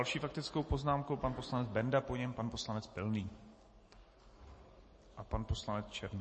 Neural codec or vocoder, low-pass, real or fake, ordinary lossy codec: none; 10.8 kHz; real; MP3, 32 kbps